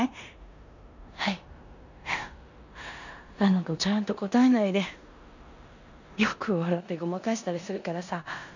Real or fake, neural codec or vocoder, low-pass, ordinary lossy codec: fake; codec, 16 kHz in and 24 kHz out, 0.9 kbps, LongCat-Audio-Codec, four codebook decoder; 7.2 kHz; none